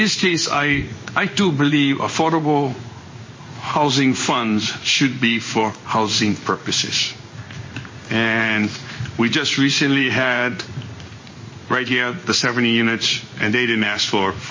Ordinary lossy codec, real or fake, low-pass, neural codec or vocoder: MP3, 32 kbps; fake; 7.2 kHz; codec, 16 kHz in and 24 kHz out, 1 kbps, XY-Tokenizer